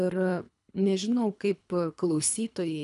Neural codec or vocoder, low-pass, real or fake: codec, 24 kHz, 3 kbps, HILCodec; 10.8 kHz; fake